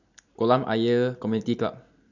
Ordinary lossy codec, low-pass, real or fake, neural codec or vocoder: none; 7.2 kHz; real; none